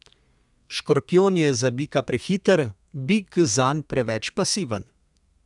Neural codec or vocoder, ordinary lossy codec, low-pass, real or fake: codec, 32 kHz, 1.9 kbps, SNAC; none; 10.8 kHz; fake